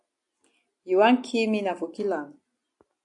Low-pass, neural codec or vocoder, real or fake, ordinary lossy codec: 10.8 kHz; none; real; AAC, 64 kbps